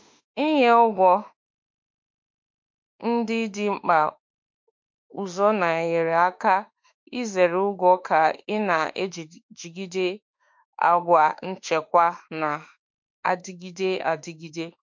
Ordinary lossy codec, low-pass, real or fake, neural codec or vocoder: MP3, 48 kbps; 7.2 kHz; fake; autoencoder, 48 kHz, 32 numbers a frame, DAC-VAE, trained on Japanese speech